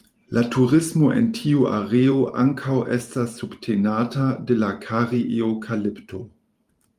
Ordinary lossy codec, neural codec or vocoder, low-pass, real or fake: Opus, 32 kbps; vocoder, 44.1 kHz, 128 mel bands every 512 samples, BigVGAN v2; 14.4 kHz; fake